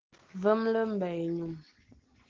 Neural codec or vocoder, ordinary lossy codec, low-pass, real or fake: none; Opus, 16 kbps; 7.2 kHz; real